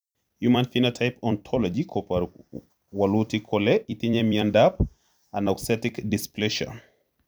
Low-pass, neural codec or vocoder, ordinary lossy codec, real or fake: none; vocoder, 44.1 kHz, 128 mel bands every 256 samples, BigVGAN v2; none; fake